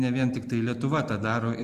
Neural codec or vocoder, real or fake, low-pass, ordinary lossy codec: none; real; 14.4 kHz; Opus, 24 kbps